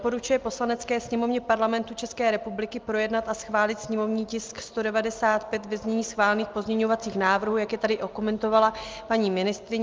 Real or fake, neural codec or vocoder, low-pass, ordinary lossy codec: real; none; 7.2 kHz; Opus, 24 kbps